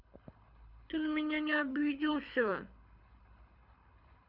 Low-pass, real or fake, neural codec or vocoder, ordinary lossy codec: 5.4 kHz; fake; codec, 24 kHz, 6 kbps, HILCodec; none